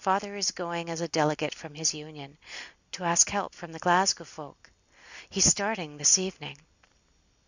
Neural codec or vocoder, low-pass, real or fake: none; 7.2 kHz; real